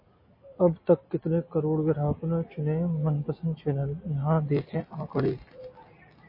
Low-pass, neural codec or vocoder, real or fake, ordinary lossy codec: 5.4 kHz; none; real; MP3, 24 kbps